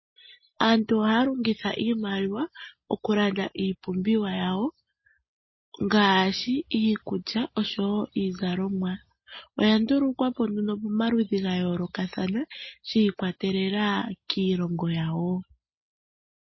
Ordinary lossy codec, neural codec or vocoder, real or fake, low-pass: MP3, 24 kbps; none; real; 7.2 kHz